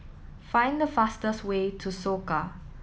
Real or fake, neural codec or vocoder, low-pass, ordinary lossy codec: real; none; none; none